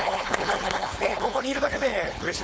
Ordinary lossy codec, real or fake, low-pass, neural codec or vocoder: none; fake; none; codec, 16 kHz, 4.8 kbps, FACodec